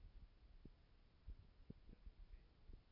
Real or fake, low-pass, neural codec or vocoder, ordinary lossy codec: real; 5.4 kHz; none; MP3, 48 kbps